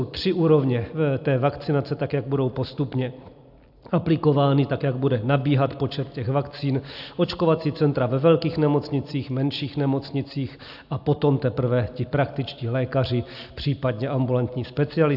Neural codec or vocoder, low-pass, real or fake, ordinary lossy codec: none; 5.4 kHz; real; AAC, 48 kbps